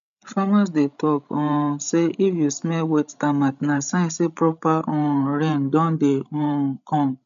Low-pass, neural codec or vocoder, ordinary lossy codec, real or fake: 7.2 kHz; codec, 16 kHz, 16 kbps, FreqCodec, larger model; none; fake